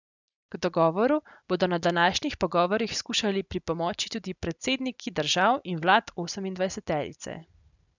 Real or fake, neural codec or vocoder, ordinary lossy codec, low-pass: real; none; none; 7.2 kHz